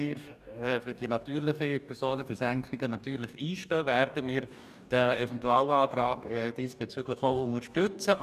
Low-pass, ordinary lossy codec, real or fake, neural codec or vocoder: 14.4 kHz; none; fake; codec, 44.1 kHz, 2.6 kbps, DAC